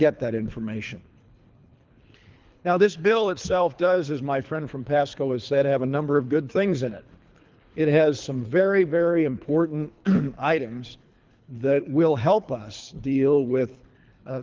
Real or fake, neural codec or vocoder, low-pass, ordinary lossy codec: fake; codec, 24 kHz, 3 kbps, HILCodec; 7.2 kHz; Opus, 24 kbps